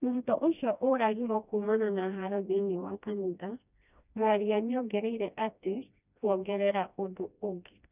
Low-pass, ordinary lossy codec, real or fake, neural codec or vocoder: 3.6 kHz; none; fake; codec, 16 kHz, 1 kbps, FreqCodec, smaller model